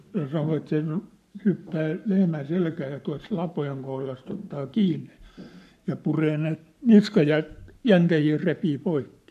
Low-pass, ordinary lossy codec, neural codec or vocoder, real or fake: 14.4 kHz; none; codec, 44.1 kHz, 7.8 kbps, Pupu-Codec; fake